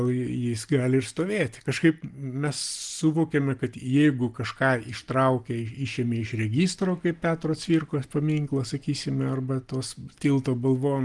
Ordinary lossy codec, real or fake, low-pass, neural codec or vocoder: Opus, 24 kbps; real; 10.8 kHz; none